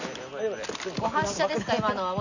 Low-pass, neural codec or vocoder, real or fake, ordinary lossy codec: 7.2 kHz; none; real; none